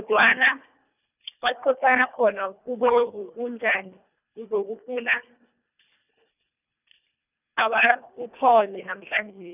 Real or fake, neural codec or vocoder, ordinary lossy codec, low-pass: fake; codec, 24 kHz, 1.5 kbps, HILCodec; none; 3.6 kHz